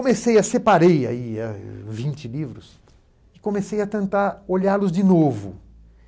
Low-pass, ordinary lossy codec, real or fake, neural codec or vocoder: none; none; real; none